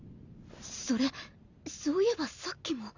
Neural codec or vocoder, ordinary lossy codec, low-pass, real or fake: vocoder, 44.1 kHz, 128 mel bands every 512 samples, BigVGAN v2; Opus, 64 kbps; 7.2 kHz; fake